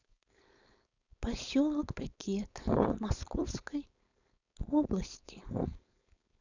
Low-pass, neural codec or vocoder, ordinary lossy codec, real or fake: 7.2 kHz; codec, 16 kHz, 4.8 kbps, FACodec; none; fake